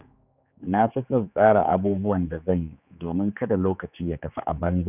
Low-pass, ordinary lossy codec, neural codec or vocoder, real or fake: 3.6 kHz; none; codec, 16 kHz, 4 kbps, X-Codec, HuBERT features, trained on general audio; fake